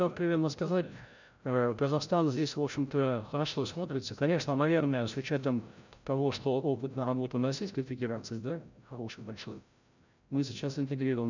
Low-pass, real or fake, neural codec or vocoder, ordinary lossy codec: 7.2 kHz; fake; codec, 16 kHz, 0.5 kbps, FreqCodec, larger model; none